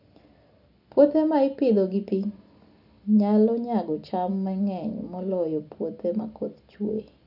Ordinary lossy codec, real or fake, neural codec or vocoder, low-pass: none; real; none; 5.4 kHz